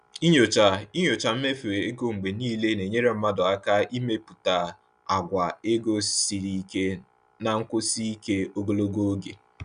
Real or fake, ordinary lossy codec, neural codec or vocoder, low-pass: real; none; none; 9.9 kHz